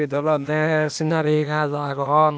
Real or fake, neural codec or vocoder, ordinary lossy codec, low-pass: fake; codec, 16 kHz, 0.8 kbps, ZipCodec; none; none